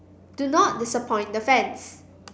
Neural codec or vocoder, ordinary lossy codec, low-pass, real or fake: none; none; none; real